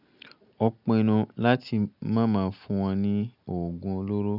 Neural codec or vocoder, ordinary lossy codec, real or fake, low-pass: none; none; real; 5.4 kHz